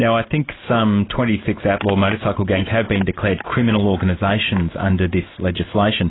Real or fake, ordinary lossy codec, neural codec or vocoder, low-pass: real; AAC, 16 kbps; none; 7.2 kHz